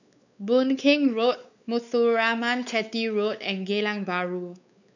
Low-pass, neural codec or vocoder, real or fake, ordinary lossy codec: 7.2 kHz; codec, 16 kHz, 4 kbps, X-Codec, WavLM features, trained on Multilingual LibriSpeech; fake; none